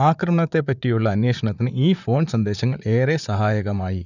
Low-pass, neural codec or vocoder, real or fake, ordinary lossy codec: 7.2 kHz; vocoder, 44.1 kHz, 128 mel bands, Pupu-Vocoder; fake; none